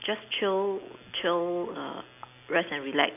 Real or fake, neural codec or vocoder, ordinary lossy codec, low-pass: real; none; AAC, 32 kbps; 3.6 kHz